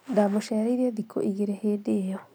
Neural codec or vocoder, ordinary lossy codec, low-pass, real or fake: none; none; none; real